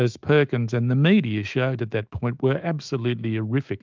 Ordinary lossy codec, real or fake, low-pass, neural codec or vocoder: Opus, 32 kbps; fake; 7.2 kHz; autoencoder, 48 kHz, 128 numbers a frame, DAC-VAE, trained on Japanese speech